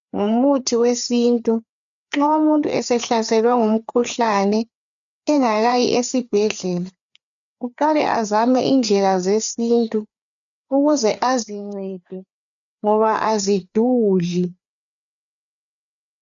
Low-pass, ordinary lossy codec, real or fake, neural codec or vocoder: 7.2 kHz; MP3, 96 kbps; fake; codec, 16 kHz, 4 kbps, FreqCodec, larger model